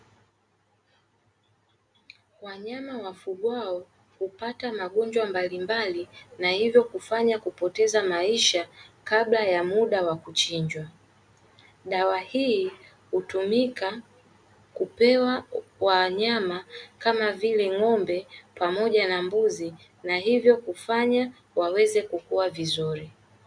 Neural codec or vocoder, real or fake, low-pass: none; real; 9.9 kHz